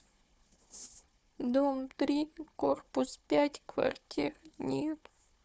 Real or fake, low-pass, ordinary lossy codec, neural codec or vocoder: fake; none; none; codec, 16 kHz, 4 kbps, FunCodec, trained on Chinese and English, 50 frames a second